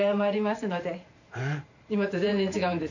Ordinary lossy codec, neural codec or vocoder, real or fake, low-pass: none; vocoder, 44.1 kHz, 128 mel bands every 512 samples, BigVGAN v2; fake; 7.2 kHz